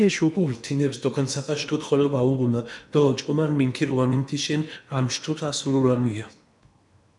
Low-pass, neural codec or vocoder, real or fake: 10.8 kHz; codec, 16 kHz in and 24 kHz out, 0.8 kbps, FocalCodec, streaming, 65536 codes; fake